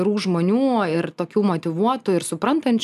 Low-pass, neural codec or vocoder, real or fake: 14.4 kHz; none; real